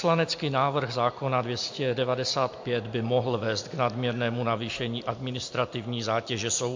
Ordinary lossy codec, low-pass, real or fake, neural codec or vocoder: MP3, 48 kbps; 7.2 kHz; real; none